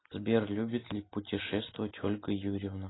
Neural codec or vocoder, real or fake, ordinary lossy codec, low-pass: none; real; AAC, 16 kbps; 7.2 kHz